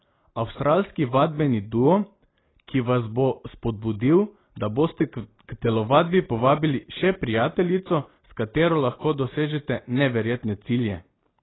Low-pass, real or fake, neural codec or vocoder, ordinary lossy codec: 7.2 kHz; real; none; AAC, 16 kbps